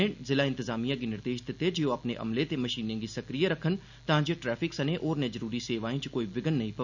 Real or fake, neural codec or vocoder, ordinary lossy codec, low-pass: real; none; none; 7.2 kHz